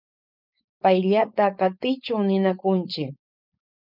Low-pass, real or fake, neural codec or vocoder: 5.4 kHz; fake; codec, 16 kHz, 4.8 kbps, FACodec